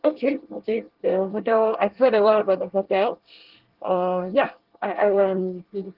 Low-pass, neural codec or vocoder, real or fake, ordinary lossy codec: 5.4 kHz; codec, 24 kHz, 1 kbps, SNAC; fake; Opus, 16 kbps